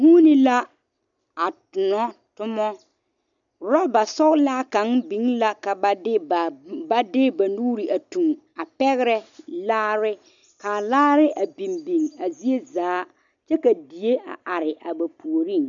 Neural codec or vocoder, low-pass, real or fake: none; 7.2 kHz; real